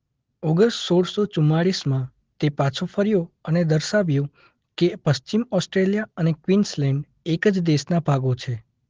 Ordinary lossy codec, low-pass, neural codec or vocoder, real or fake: Opus, 16 kbps; 7.2 kHz; none; real